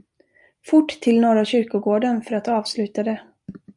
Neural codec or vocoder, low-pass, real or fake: none; 10.8 kHz; real